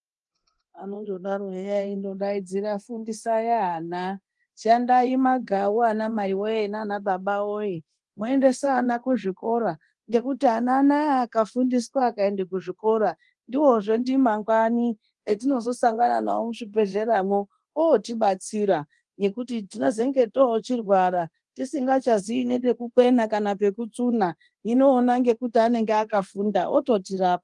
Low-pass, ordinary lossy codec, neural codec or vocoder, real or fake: 10.8 kHz; Opus, 24 kbps; codec, 24 kHz, 0.9 kbps, DualCodec; fake